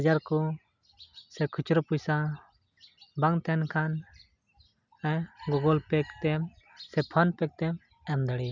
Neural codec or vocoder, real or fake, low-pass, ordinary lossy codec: none; real; 7.2 kHz; none